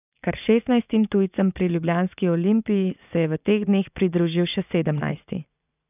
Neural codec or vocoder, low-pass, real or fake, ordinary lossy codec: vocoder, 44.1 kHz, 80 mel bands, Vocos; 3.6 kHz; fake; none